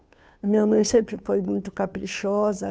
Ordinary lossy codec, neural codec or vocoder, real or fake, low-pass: none; codec, 16 kHz, 2 kbps, FunCodec, trained on Chinese and English, 25 frames a second; fake; none